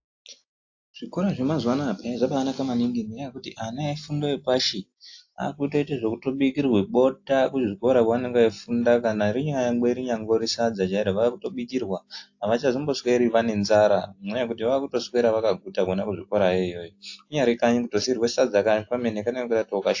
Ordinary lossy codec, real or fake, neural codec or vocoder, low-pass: AAC, 48 kbps; real; none; 7.2 kHz